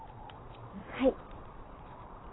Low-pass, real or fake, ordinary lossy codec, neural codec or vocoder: 7.2 kHz; fake; AAC, 16 kbps; vocoder, 22.05 kHz, 80 mel bands, Vocos